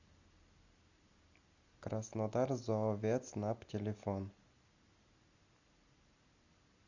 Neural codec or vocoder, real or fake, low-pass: none; real; 7.2 kHz